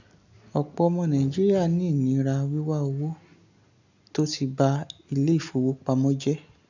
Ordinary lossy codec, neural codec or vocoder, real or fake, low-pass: none; none; real; 7.2 kHz